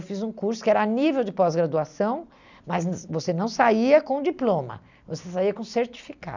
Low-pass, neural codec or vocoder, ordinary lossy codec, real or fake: 7.2 kHz; none; none; real